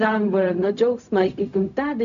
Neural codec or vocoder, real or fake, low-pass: codec, 16 kHz, 0.4 kbps, LongCat-Audio-Codec; fake; 7.2 kHz